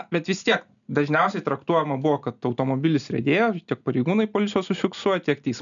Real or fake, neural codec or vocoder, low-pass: real; none; 7.2 kHz